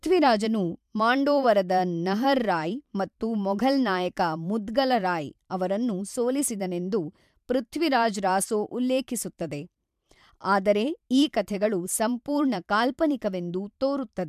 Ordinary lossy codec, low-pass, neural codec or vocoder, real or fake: MP3, 96 kbps; 14.4 kHz; vocoder, 44.1 kHz, 128 mel bands every 512 samples, BigVGAN v2; fake